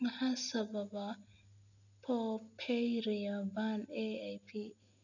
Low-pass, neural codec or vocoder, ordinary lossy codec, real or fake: 7.2 kHz; none; none; real